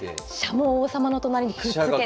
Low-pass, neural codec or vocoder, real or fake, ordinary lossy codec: none; none; real; none